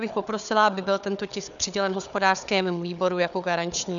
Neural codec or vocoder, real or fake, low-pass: codec, 16 kHz, 4 kbps, FunCodec, trained on Chinese and English, 50 frames a second; fake; 7.2 kHz